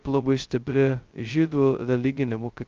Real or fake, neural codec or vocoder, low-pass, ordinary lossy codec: fake; codec, 16 kHz, 0.2 kbps, FocalCodec; 7.2 kHz; Opus, 24 kbps